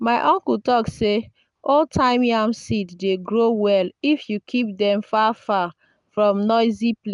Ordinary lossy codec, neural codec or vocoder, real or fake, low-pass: none; none; real; 10.8 kHz